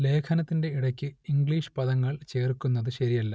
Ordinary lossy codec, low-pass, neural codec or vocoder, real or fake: none; none; none; real